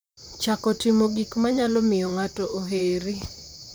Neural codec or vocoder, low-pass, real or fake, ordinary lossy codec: vocoder, 44.1 kHz, 128 mel bands, Pupu-Vocoder; none; fake; none